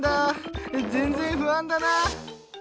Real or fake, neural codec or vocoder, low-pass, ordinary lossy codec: real; none; none; none